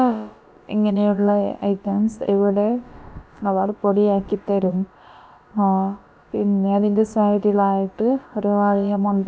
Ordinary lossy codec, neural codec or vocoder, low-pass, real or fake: none; codec, 16 kHz, about 1 kbps, DyCAST, with the encoder's durations; none; fake